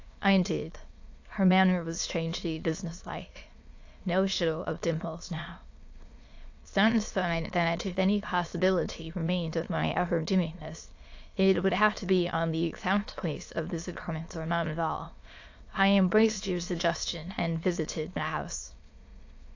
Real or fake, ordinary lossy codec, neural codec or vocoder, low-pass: fake; AAC, 48 kbps; autoencoder, 22.05 kHz, a latent of 192 numbers a frame, VITS, trained on many speakers; 7.2 kHz